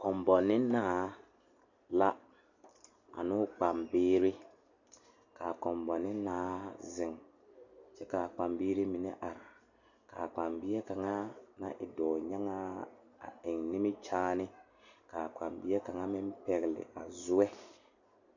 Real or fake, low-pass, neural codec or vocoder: real; 7.2 kHz; none